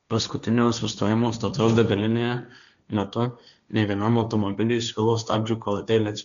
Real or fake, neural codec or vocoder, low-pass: fake; codec, 16 kHz, 1.1 kbps, Voila-Tokenizer; 7.2 kHz